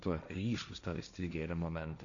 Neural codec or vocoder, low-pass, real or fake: codec, 16 kHz, 1.1 kbps, Voila-Tokenizer; 7.2 kHz; fake